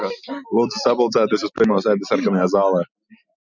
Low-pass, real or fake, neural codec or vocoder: 7.2 kHz; real; none